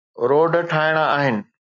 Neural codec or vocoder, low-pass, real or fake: none; 7.2 kHz; real